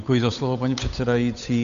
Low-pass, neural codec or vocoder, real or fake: 7.2 kHz; codec, 16 kHz, 8 kbps, FunCodec, trained on Chinese and English, 25 frames a second; fake